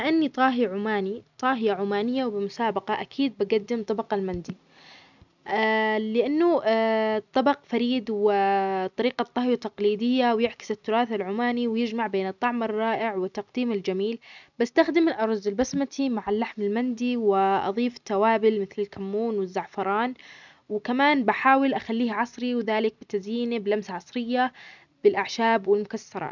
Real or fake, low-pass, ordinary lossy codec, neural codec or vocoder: real; 7.2 kHz; none; none